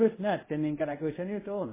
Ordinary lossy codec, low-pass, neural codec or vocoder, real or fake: MP3, 16 kbps; 3.6 kHz; codec, 24 kHz, 0.5 kbps, DualCodec; fake